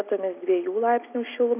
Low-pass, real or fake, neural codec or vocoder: 3.6 kHz; real; none